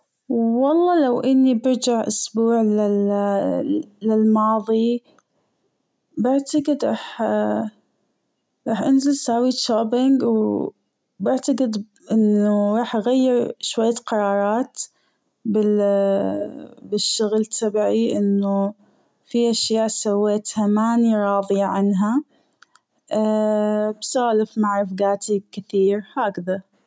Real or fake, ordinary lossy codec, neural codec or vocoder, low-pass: real; none; none; none